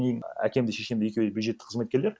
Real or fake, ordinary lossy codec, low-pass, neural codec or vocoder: real; none; none; none